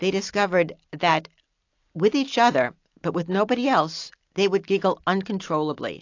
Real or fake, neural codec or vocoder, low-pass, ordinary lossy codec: real; none; 7.2 kHz; AAC, 48 kbps